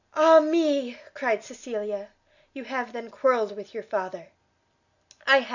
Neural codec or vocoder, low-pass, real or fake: none; 7.2 kHz; real